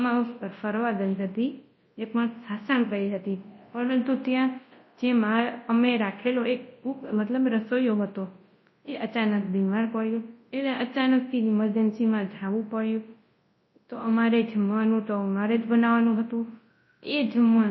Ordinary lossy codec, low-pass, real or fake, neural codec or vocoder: MP3, 24 kbps; 7.2 kHz; fake; codec, 24 kHz, 0.9 kbps, WavTokenizer, large speech release